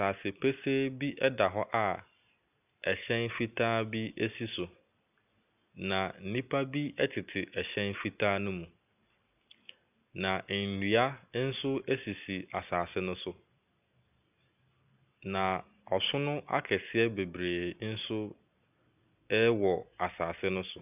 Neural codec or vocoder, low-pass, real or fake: none; 3.6 kHz; real